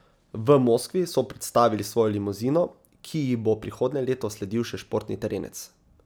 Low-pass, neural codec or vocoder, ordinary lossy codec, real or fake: none; none; none; real